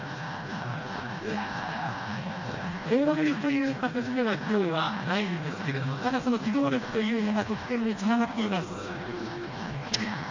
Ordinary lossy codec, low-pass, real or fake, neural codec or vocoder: MP3, 48 kbps; 7.2 kHz; fake; codec, 16 kHz, 1 kbps, FreqCodec, smaller model